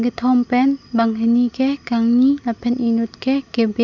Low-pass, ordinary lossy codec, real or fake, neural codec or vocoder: 7.2 kHz; none; real; none